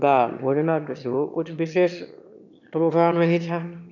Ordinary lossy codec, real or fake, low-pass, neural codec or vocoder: none; fake; 7.2 kHz; autoencoder, 22.05 kHz, a latent of 192 numbers a frame, VITS, trained on one speaker